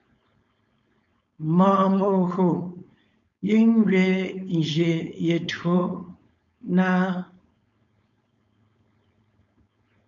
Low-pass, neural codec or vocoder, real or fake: 7.2 kHz; codec, 16 kHz, 4.8 kbps, FACodec; fake